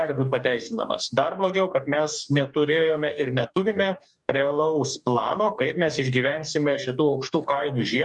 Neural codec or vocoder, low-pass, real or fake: codec, 44.1 kHz, 2.6 kbps, DAC; 10.8 kHz; fake